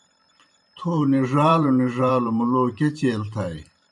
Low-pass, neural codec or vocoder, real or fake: 10.8 kHz; vocoder, 44.1 kHz, 128 mel bands every 512 samples, BigVGAN v2; fake